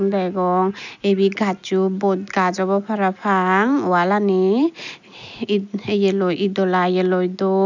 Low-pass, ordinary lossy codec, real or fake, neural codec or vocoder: 7.2 kHz; none; real; none